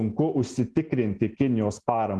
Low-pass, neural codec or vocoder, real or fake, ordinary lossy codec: 10.8 kHz; none; real; Opus, 16 kbps